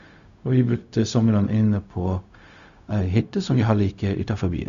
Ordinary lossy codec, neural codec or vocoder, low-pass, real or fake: Opus, 64 kbps; codec, 16 kHz, 0.4 kbps, LongCat-Audio-Codec; 7.2 kHz; fake